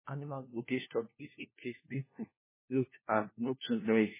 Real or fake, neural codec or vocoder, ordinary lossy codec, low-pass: fake; codec, 16 kHz, 1 kbps, FunCodec, trained on LibriTTS, 50 frames a second; MP3, 16 kbps; 3.6 kHz